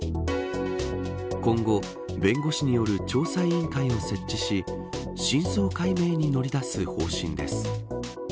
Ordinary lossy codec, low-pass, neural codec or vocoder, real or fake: none; none; none; real